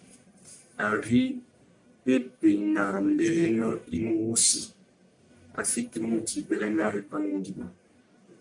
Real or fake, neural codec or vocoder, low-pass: fake; codec, 44.1 kHz, 1.7 kbps, Pupu-Codec; 10.8 kHz